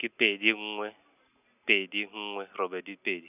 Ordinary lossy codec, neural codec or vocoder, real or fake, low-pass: none; none; real; 3.6 kHz